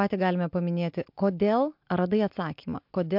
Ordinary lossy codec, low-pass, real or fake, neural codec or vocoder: MP3, 48 kbps; 5.4 kHz; real; none